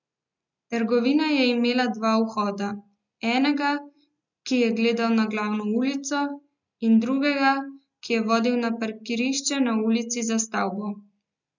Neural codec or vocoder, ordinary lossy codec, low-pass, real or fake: none; none; 7.2 kHz; real